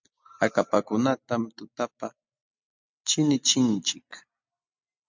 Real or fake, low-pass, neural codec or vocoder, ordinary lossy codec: real; 7.2 kHz; none; MP3, 64 kbps